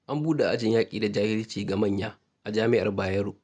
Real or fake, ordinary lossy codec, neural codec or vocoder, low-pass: real; none; none; none